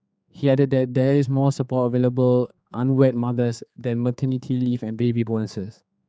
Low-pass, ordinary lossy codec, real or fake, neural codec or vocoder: none; none; fake; codec, 16 kHz, 4 kbps, X-Codec, HuBERT features, trained on general audio